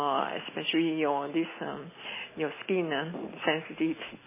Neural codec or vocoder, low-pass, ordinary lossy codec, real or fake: none; 3.6 kHz; MP3, 16 kbps; real